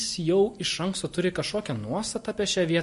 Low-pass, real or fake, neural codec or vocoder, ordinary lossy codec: 14.4 kHz; real; none; MP3, 48 kbps